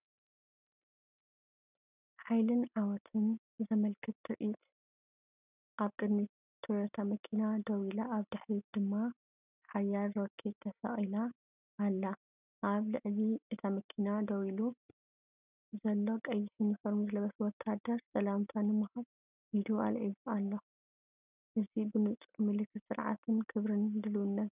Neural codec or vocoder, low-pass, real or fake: none; 3.6 kHz; real